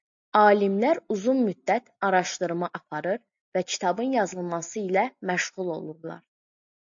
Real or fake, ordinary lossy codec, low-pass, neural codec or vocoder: real; AAC, 64 kbps; 7.2 kHz; none